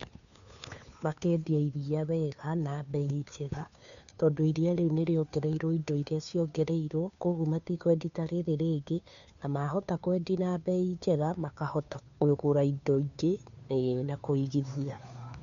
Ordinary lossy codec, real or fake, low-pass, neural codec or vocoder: none; fake; 7.2 kHz; codec, 16 kHz, 2 kbps, FunCodec, trained on Chinese and English, 25 frames a second